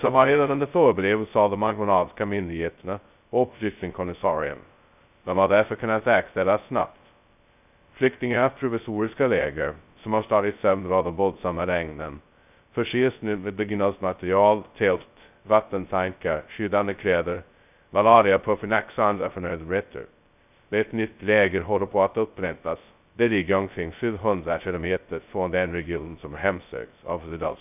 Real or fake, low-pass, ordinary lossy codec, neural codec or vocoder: fake; 3.6 kHz; none; codec, 16 kHz, 0.2 kbps, FocalCodec